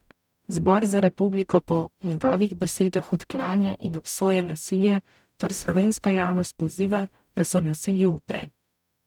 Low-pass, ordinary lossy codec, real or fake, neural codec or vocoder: 19.8 kHz; none; fake; codec, 44.1 kHz, 0.9 kbps, DAC